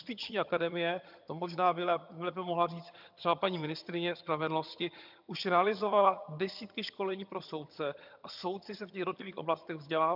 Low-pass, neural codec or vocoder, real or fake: 5.4 kHz; vocoder, 22.05 kHz, 80 mel bands, HiFi-GAN; fake